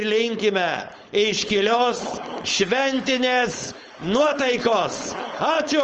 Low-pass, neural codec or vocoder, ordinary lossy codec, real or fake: 7.2 kHz; codec, 16 kHz, 4.8 kbps, FACodec; Opus, 24 kbps; fake